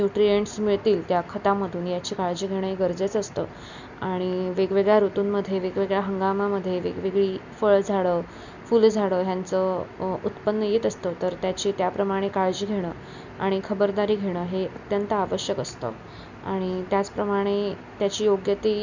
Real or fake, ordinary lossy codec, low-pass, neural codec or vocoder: real; none; 7.2 kHz; none